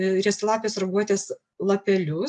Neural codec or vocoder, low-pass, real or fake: none; 10.8 kHz; real